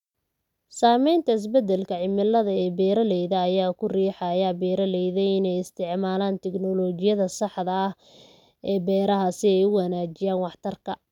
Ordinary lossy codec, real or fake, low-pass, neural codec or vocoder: none; real; 19.8 kHz; none